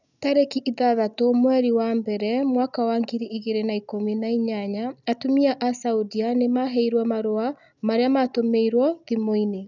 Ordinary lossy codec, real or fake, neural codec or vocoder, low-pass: none; real; none; 7.2 kHz